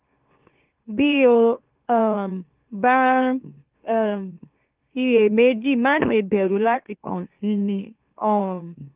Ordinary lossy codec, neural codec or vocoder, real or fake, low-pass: Opus, 32 kbps; autoencoder, 44.1 kHz, a latent of 192 numbers a frame, MeloTTS; fake; 3.6 kHz